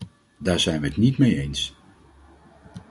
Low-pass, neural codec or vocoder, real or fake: 10.8 kHz; none; real